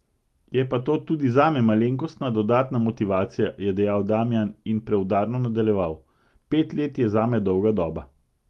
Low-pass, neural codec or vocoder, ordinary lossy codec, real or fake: 19.8 kHz; none; Opus, 24 kbps; real